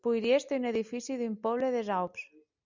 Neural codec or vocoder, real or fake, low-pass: none; real; 7.2 kHz